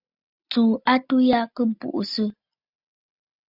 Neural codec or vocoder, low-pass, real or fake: none; 5.4 kHz; real